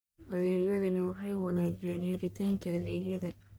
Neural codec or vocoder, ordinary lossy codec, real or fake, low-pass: codec, 44.1 kHz, 1.7 kbps, Pupu-Codec; none; fake; none